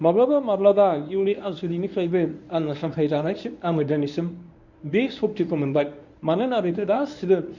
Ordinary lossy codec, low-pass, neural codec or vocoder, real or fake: none; 7.2 kHz; codec, 24 kHz, 0.9 kbps, WavTokenizer, medium speech release version 1; fake